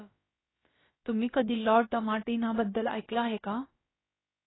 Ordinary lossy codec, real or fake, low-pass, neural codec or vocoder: AAC, 16 kbps; fake; 7.2 kHz; codec, 16 kHz, about 1 kbps, DyCAST, with the encoder's durations